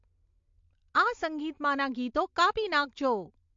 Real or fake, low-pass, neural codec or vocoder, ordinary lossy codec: real; 7.2 kHz; none; MP3, 48 kbps